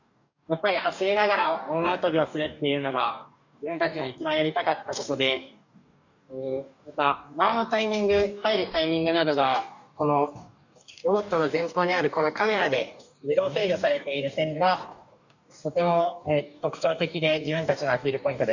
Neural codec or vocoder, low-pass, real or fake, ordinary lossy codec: codec, 44.1 kHz, 2.6 kbps, DAC; 7.2 kHz; fake; none